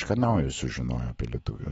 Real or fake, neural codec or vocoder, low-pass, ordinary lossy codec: fake; autoencoder, 48 kHz, 128 numbers a frame, DAC-VAE, trained on Japanese speech; 19.8 kHz; AAC, 24 kbps